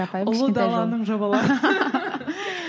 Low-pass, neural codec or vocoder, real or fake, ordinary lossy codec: none; none; real; none